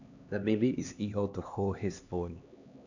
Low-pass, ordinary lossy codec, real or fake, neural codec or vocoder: 7.2 kHz; none; fake; codec, 16 kHz, 2 kbps, X-Codec, HuBERT features, trained on LibriSpeech